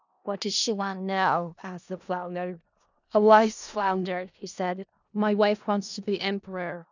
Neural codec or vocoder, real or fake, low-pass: codec, 16 kHz in and 24 kHz out, 0.4 kbps, LongCat-Audio-Codec, four codebook decoder; fake; 7.2 kHz